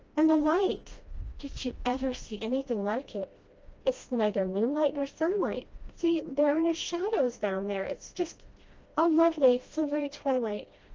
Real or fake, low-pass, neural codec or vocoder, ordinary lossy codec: fake; 7.2 kHz; codec, 16 kHz, 1 kbps, FreqCodec, smaller model; Opus, 24 kbps